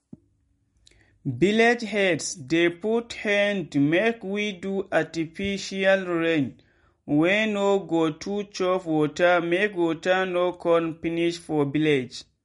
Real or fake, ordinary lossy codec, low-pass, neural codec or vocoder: real; MP3, 48 kbps; 19.8 kHz; none